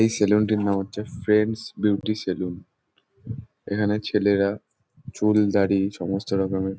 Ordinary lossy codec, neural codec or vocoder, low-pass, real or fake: none; none; none; real